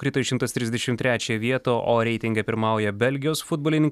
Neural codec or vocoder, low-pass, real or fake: none; 14.4 kHz; real